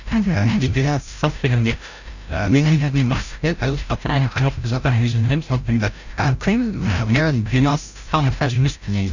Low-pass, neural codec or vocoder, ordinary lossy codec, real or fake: 7.2 kHz; codec, 16 kHz, 0.5 kbps, FreqCodec, larger model; none; fake